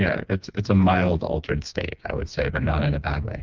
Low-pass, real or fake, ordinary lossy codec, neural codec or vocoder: 7.2 kHz; fake; Opus, 24 kbps; codec, 16 kHz, 2 kbps, FreqCodec, smaller model